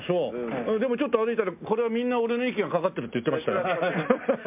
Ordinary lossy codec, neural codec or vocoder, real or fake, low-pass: MP3, 32 kbps; none; real; 3.6 kHz